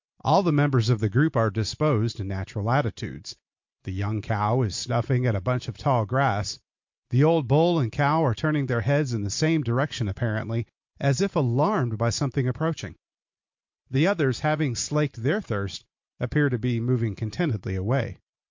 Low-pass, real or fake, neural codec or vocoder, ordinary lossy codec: 7.2 kHz; real; none; MP3, 48 kbps